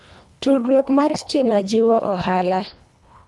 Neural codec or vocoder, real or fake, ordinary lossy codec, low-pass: codec, 24 kHz, 1.5 kbps, HILCodec; fake; none; none